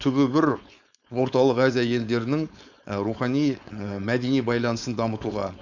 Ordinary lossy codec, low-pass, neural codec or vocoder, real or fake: none; 7.2 kHz; codec, 16 kHz, 4.8 kbps, FACodec; fake